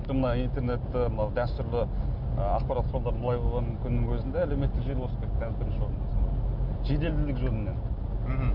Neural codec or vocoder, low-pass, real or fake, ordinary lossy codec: none; 5.4 kHz; real; none